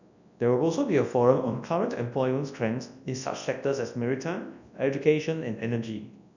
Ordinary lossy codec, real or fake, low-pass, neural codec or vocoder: none; fake; 7.2 kHz; codec, 24 kHz, 0.9 kbps, WavTokenizer, large speech release